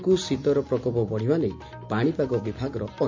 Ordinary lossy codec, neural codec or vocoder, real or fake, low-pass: MP3, 48 kbps; none; real; 7.2 kHz